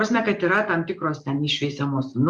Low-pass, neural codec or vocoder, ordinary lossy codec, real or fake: 10.8 kHz; none; Opus, 64 kbps; real